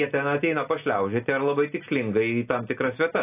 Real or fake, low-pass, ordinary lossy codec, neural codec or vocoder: real; 3.6 kHz; AAC, 24 kbps; none